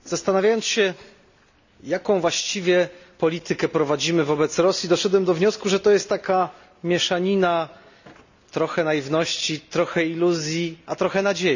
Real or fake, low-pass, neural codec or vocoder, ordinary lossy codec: real; 7.2 kHz; none; none